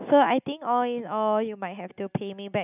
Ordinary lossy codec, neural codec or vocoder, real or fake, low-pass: none; none; real; 3.6 kHz